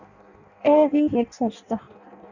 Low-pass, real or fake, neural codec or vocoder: 7.2 kHz; fake; codec, 16 kHz in and 24 kHz out, 0.6 kbps, FireRedTTS-2 codec